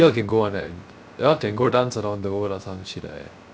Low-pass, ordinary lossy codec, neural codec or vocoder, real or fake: none; none; codec, 16 kHz, 0.3 kbps, FocalCodec; fake